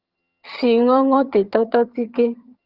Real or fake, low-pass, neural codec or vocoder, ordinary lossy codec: fake; 5.4 kHz; vocoder, 22.05 kHz, 80 mel bands, HiFi-GAN; Opus, 24 kbps